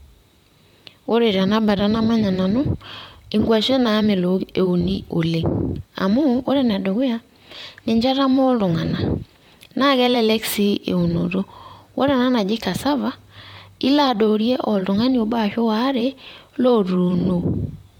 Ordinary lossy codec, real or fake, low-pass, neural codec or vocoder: MP3, 96 kbps; fake; 19.8 kHz; vocoder, 44.1 kHz, 128 mel bands, Pupu-Vocoder